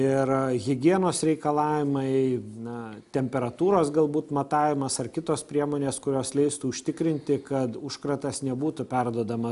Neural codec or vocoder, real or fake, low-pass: none; real; 10.8 kHz